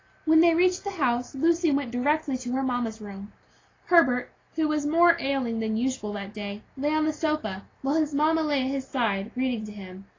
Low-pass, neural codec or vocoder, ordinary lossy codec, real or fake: 7.2 kHz; none; AAC, 32 kbps; real